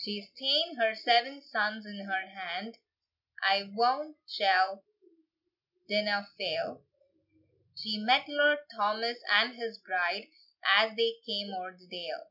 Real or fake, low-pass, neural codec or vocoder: real; 5.4 kHz; none